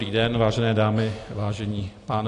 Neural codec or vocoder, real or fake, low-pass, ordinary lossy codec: none; real; 10.8 kHz; AAC, 48 kbps